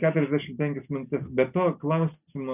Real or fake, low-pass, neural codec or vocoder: real; 3.6 kHz; none